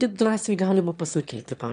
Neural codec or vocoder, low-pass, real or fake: autoencoder, 22.05 kHz, a latent of 192 numbers a frame, VITS, trained on one speaker; 9.9 kHz; fake